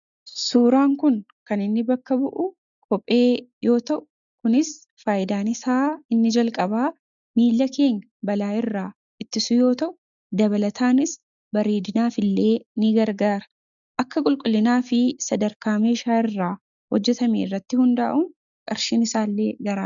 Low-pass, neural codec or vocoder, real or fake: 7.2 kHz; none; real